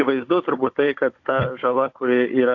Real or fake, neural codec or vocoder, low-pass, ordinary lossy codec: fake; codec, 16 kHz, 16 kbps, FunCodec, trained on Chinese and English, 50 frames a second; 7.2 kHz; AAC, 48 kbps